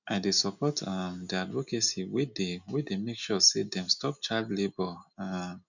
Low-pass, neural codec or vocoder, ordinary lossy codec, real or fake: 7.2 kHz; none; none; real